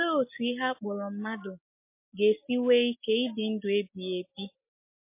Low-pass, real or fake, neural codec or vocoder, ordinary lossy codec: 3.6 kHz; real; none; MP3, 24 kbps